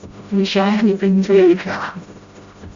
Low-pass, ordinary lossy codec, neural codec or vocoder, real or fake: 7.2 kHz; Opus, 64 kbps; codec, 16 kHz, 0.5 kbps, FreqCodec, smaller model; fake